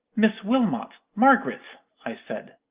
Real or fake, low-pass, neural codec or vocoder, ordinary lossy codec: real; 3.6 kHz; none; Opus, 24 kbps